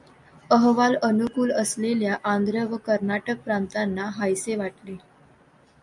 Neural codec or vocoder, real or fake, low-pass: none; real; 10.8 kHz